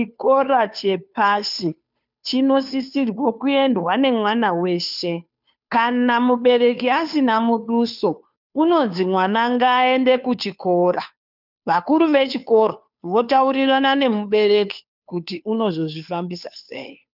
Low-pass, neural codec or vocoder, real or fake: 5.4 kHz; codec, 16 kHz, 2 kbps, FunCodec, trained on Chinese and English, 25 frames a second; fake